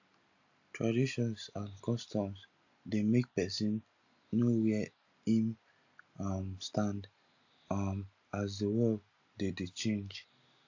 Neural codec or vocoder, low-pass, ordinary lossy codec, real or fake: none; 7.2 kHz; AAC, 48 kbps; real